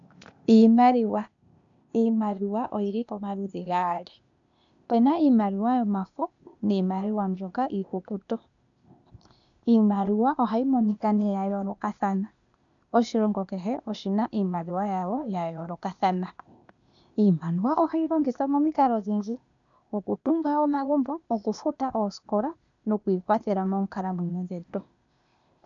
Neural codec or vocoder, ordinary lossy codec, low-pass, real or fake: codec, 16 kHz, 0.8 kbps, ZipCodec; MP3, 96 kbps; 7.2 kHz; fake